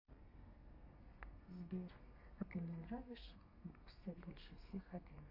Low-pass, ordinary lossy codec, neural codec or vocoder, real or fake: 5.4 kHz; MP3, 32 kbps; codec, 32 kHz, 1.9 kbps, SNAC; fake